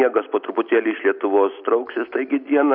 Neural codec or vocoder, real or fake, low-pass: none; real; 5.4 kHz